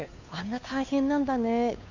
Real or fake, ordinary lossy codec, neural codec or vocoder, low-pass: fake; none; codec, 16 kHz, 2 kbps, FunCodec, trained on Chinese and English, 25 frames a second; 7.2 kHz